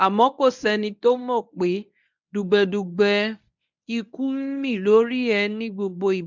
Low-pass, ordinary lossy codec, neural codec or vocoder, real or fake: 7.2 kHz; none; codec, 24 kHz, 0.9 kbps, WavTokenizer, medium speech release version 1; fake